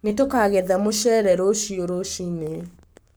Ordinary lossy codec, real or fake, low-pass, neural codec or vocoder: none; fake; none; codec, 44.1 kHz, 7.8 kbps, Pupu-Codec